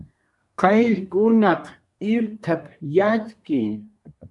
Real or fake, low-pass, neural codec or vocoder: fake; 10.8 kHz; codec, 24 kHz, 1 kbps, SNAC